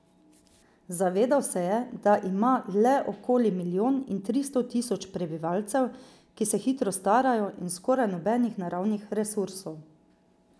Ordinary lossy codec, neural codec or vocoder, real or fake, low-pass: none; none; real; none